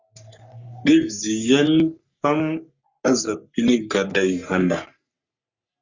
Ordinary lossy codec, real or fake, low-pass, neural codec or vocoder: Opus, 64 kbps; fake; 7.2 kHz; codec, 44.1 kHz, 3.4 kbps, Pupu-Codec